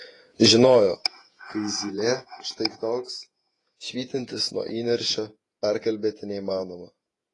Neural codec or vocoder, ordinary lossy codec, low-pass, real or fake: vocoder, 48 kHz, 128 mel bands, Vocos; AAC, 32 kbps; 10.8 kHz; fake